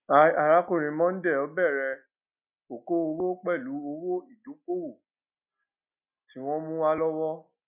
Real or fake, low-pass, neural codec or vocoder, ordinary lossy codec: real; 3.6 kHz; none; none